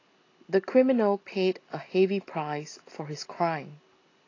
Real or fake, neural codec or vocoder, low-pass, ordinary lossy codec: real; none; 7.2 kHz; AAC, 32 kbps